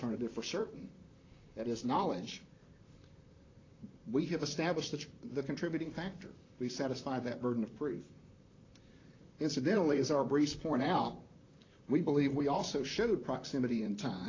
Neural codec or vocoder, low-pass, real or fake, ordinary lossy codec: vocoder, 44.1 kHz, 128 mel bands, Pupu-Vocoder; 7.2 kHz; fake; AAC, 32 kbps